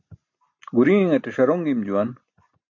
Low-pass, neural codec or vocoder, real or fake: 7.2 kHz; none; real